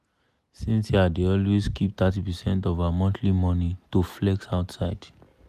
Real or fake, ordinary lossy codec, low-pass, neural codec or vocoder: real; none; 14.4 kHz; none